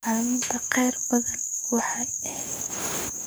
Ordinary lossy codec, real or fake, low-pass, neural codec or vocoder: none; fake; none; codec, 44.1 kHz, 7.8 kbps, DAC